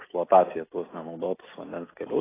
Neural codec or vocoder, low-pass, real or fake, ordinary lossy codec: none; 3.6 kHz; real; AAC, 16 kbps